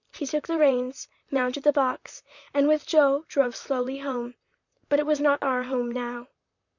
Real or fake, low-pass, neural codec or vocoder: fake; 7.2 kHz; vocoder, 44.1 kHz, 128 mel bands, Pupu-Vocoder